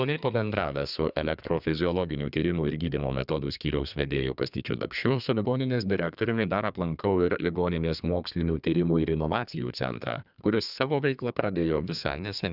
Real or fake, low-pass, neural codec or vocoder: fake; 5.4 kHz; codec, 32 kHz, 1.9 kbps, SNAC